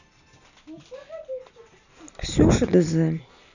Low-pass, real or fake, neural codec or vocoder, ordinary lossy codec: 7.2 kHz; real; none; none